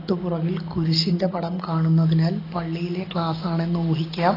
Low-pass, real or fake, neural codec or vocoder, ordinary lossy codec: 5.4 kHz; real; none; AAC, 24 kbps